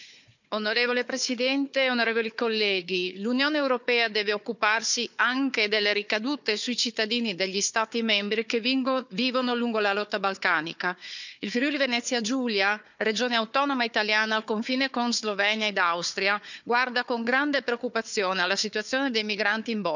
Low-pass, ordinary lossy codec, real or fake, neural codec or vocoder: 7.2 kHz; none; fake; codec, 16 kHz, 4 kbps, FunCodec, trained on Chinese and English, 50 frames a second